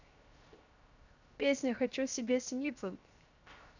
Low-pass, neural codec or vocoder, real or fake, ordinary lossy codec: 7.2 kHz; codec, 16 kHz, 0.7 kbps, FocalCodec; fake; none